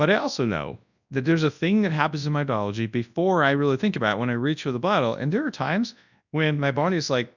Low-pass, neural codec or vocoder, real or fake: 7.2 kHz; codec, 24 kHz, 0.9 kbps, WavTokenizer, large speech release; fake